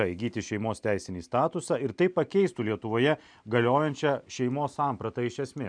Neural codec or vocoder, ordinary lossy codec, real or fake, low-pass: none; MP3, 96 kbps; real; 9.9 kHz